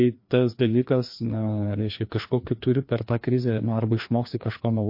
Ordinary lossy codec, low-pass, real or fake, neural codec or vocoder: MP3, 32 kbps; 5.4 kHz; fake; codec, 16 kHz, 2 kbps, FreqCodec, larger model